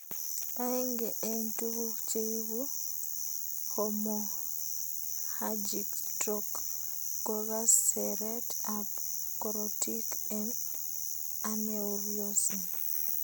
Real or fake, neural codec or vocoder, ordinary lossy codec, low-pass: real; none; none; none